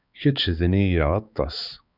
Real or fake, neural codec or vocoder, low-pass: fake; codec, 16 kHz, 4 kbps, X-Codec, HuBERT features, trained on balanced general audio; 5.4 kHz